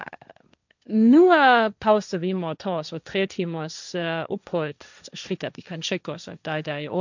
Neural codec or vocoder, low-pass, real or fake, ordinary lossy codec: codec, 16 kHz, 1.1 kbps, Voila-Tokenizer; 7.2 kHz; fake; none